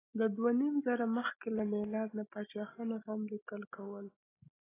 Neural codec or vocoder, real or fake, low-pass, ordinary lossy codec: none; real; 3.6 kHz; AAC, 16 kbps